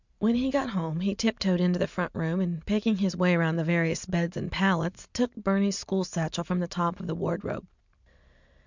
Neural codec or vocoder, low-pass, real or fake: none; 7.2 kHz; real